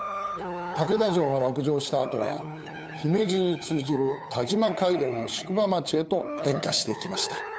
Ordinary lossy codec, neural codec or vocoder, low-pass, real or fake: none; codec, 16 kHz, 8 kbps, FunCodec, trained on LibriTTS, 25 frames a second; none; fake